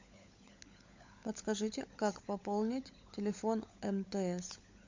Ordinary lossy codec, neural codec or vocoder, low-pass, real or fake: MP3, 64 kbps; codec, 16 kHz, 16 kbps, FunCodec, trained on LibriTTS, 50 frames a second; 7.2 kHz; fake